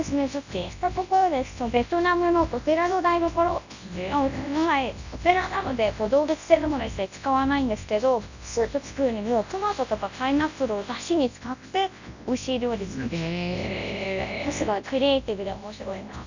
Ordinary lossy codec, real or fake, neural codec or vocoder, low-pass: none; fake; codec, 24 kHz, 0.9 kbps, WavTokenizer, large speech release; 7.2 kHz